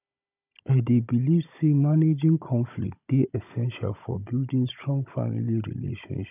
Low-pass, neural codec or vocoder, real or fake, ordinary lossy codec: 3.6 kHz; codec, 16 kHz, 16 kbps, FunCodec, trained on Chinese and English, 50 frames a second; fake; none